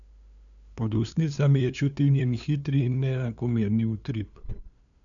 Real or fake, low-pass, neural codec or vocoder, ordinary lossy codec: fake; 7.2 kHz; codec, 16 kHz, 2 kbps, FunCodec, trained on LibriTTS, 25 frames a second; none